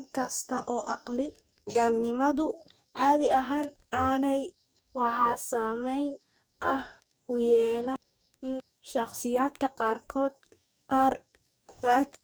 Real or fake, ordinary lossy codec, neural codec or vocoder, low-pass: fake; none; codec, 44.1 kHz, 2.6 kbps, DAC; none